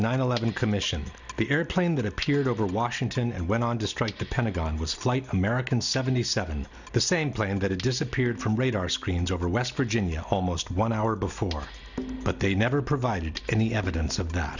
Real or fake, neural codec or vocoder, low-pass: real; none; 7.2 kHz